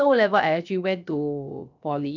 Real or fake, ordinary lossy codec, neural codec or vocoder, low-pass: fake; none; codec, 16 kHz, 0.7 kbps, FocalCodec; 7.2 kHz